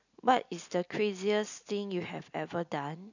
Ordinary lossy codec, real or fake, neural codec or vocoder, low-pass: none; real; none; 7.2 kHz